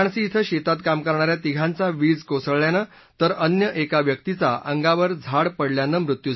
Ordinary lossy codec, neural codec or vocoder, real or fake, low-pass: MP3, 24 kbps; none; real; 7.2 kHz